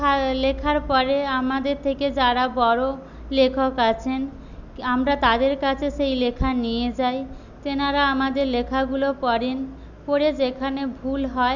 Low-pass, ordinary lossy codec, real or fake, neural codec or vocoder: 7.2 kHz; none; real; none